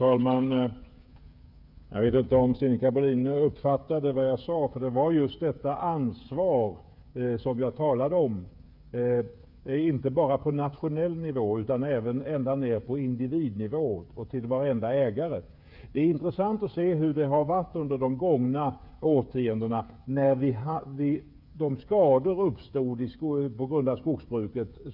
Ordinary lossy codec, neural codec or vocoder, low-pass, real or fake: none; codec, 16 kHz, 8 kbps, FreqCodec, smaller model; 5.4 kHz; fake